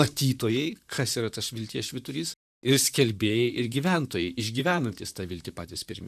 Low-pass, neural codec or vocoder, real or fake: 14.4 kHz; vocoder, 44.1 kHz, 128 mel bands, Pupu-Vocoder; fake